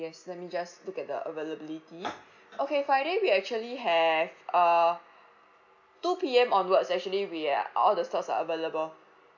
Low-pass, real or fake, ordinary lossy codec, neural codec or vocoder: 7.2 kHz; real; none; none